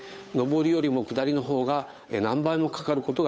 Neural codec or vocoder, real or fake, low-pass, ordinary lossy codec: codec, 16 kHz, 8 kbps, FunCodec, trained on Chinese and English, 25 frames a second; fake; none; none